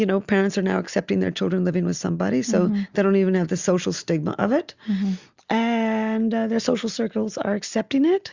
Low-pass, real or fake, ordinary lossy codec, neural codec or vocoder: 7.2 kHz; real; Opus, 64 kbps; none